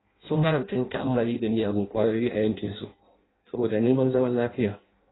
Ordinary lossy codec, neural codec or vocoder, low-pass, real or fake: AAC, 16 kbps; codec, 16 kHz in and 24 kHz out, 0.6 kbps, FireRedTTS-2 codec; 7.2 kHz; fake